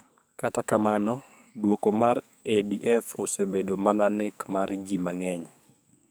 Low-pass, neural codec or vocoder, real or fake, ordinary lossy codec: none; codec, 44.1 kHz, 2.6 kbps, SNAC; fake; none